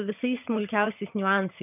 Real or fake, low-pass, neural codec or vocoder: fake; 3.6 kHz; vocoder, 22.05 kHz, 80 mel bands, HiFi-GAN